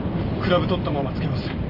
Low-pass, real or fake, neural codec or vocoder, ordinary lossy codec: 5.4 kHz; real; none; Opus, 32 kbps